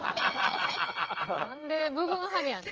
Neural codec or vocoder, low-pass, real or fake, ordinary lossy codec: vocoder, 22.05 kHz, 80 mel bands, WaveNeXt; 7.2 kHz; fake; Opus, 24 kbps